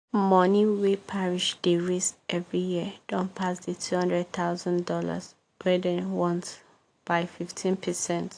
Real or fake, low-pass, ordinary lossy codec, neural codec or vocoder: real; 9.9 kHz; AAC, 48 kbps; none